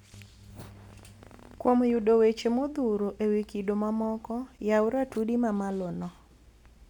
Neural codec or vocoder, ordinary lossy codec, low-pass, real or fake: none; none; 19.8 kHz; real